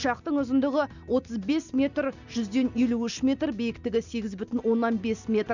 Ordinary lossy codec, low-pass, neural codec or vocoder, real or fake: none; 7.2 kHz; none; real